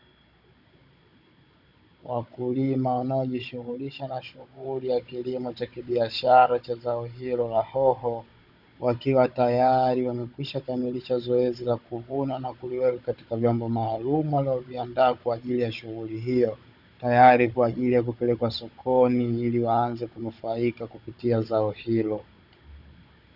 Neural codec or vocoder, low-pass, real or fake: codec, 16 kHz, 16 kbps, FunCodec, trained on Chinese and English, 50 frames a second; 5.4 kHz; fake